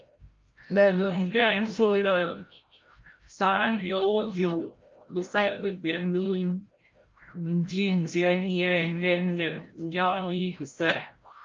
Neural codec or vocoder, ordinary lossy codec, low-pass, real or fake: codec, 16 kHz, 0.5 kbps, FreqCodec, larger model; Opus, 24 kbps; 7.2 kHz; fake